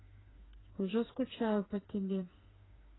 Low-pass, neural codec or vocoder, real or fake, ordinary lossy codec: 7.2 kHz; codec, 16 kHz, 4 kbps, FreqCodec, smaller model; fake; AAC, 16 kbps